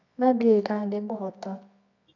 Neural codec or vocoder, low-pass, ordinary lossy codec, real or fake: codec, 24 kHz, 0.9 kbps, WavTokenizer, medium music audio release; 7.2 kHz; none; fake